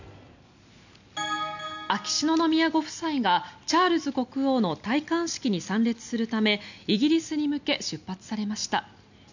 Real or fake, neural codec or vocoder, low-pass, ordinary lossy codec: real; none; 7.2 kHz; none